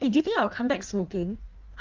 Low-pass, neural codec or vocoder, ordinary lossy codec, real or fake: 7.2 kHz; codec, 16 kHz in and 24 kHz out, 1.1 kbps, FireRedTTS-2 codec; Opus, 32 kbps; fake